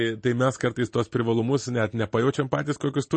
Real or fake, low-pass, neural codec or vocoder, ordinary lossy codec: real; 9.9 kHz; none; MP3, 32 kbps